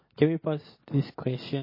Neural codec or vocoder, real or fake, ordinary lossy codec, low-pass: none; real; MP3, 24 kbps; 5.4 kHz